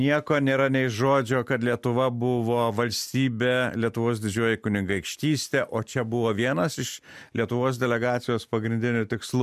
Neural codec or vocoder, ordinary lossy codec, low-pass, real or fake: none; MP3, 96 kbps; 14.4 kHz; real